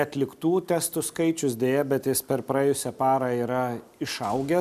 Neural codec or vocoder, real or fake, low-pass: none; real; 14.4 kHz